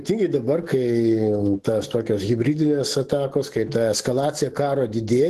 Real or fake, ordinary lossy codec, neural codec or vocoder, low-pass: fake; Opus, 16 kbps; autoencoder, 48 kHz, 128 numbers a frame, DAC-VAE, trained on Japanese speech; 14.4 kHz